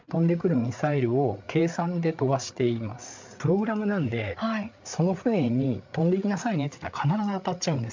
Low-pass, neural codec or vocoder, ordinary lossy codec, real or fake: 7.2 kHz; codec, 16 kHz, 8 kbps, FreqCodec, larger model; MP3, 64 kbps; fake